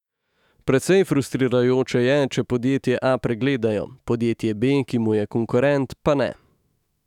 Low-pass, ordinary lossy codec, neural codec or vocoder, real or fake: 19.8 kHz; none; autoencoder, 48 kHz, 128 numbers a frame, DAC-VAE, trained on Japanese speech; fake